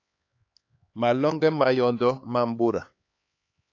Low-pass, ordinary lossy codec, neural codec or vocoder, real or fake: 7.2 kHz; AAC, 48 kbps; codec, 16 kHz, 4 kbps, X-Codec, HuBERT features, trained on LibriSpeech; fake